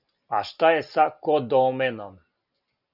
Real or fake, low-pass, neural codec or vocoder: real; 5.4 kHz; none